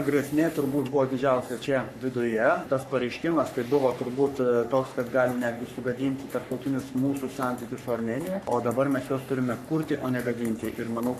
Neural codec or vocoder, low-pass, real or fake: codec, 44.1 kHz, 3.4 kbps, Pupu-Codec; 14.4 kHz; fake